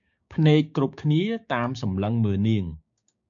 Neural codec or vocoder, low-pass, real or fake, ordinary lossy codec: codec, 16 kHz, 6 kbps, DAC; 7.2 kHz; fake; AAC, 64 kbps